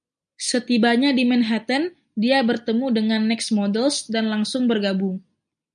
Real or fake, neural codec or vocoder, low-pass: real; none; 9.9 kHz